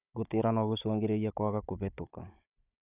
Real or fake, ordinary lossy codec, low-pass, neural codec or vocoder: fake; none; 3.6 kHz; codec, 16 kHz, 16 kbps, FunCodec, trained on Chinese and English, 50 frames a second